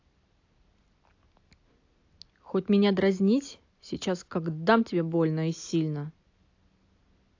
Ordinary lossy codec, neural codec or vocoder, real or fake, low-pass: AAC, 48 kbps; none; real; 7.2 kHz